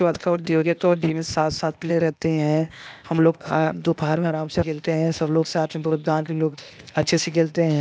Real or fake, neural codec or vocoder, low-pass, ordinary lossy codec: fake; codec, 16 kHz, 0.8 kbps, ZipCodec; none; none